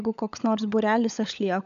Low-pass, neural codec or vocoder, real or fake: 7.2 kHz; codec, 16 kHz, 16 kbps, FunCodec, trained on LibriTTS, 50 frames a second; fake